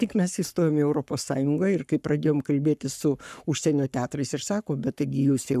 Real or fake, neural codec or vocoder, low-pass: fake; codec, 44.1 kHz, 7.8 kbps, Pupu-Codec; 14.4 kHz